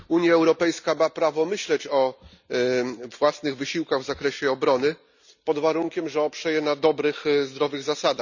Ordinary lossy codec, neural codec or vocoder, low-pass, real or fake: none; none; 7.2 kHz; real